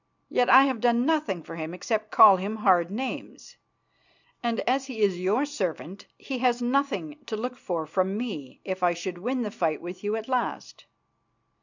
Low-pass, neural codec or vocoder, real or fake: 7.2 kHz; none; real